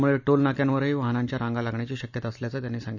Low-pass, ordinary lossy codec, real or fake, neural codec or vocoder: 7.2 kHz; none; real; none